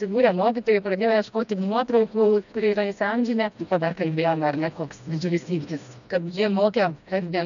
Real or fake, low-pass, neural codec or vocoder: fake; 7.2 kHz; codec, 16 kHz, 1 kbps, FreqCodec, smaller model